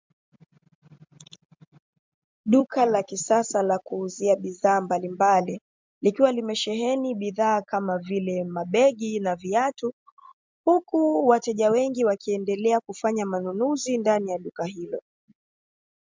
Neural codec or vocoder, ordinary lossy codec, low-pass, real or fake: none; MP3, 64 kbps; 7.2 kHz; real